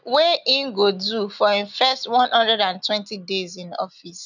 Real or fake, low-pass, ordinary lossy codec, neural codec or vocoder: real; 7.2 kHz; none; none